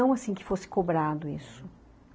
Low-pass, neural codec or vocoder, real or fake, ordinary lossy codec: none; none; real; none